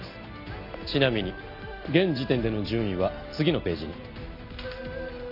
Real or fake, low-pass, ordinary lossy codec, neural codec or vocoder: real; 5.4 kHz; none; none